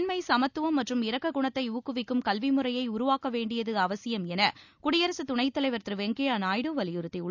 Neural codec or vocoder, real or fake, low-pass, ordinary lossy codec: none; real; 7.2 kHz; none